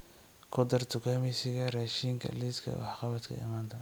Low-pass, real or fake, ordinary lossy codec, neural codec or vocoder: none; real; none; none